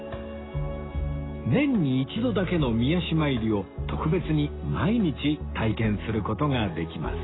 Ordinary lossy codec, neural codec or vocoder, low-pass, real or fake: AAC, 16 kbps; none; 7.2 kHz; real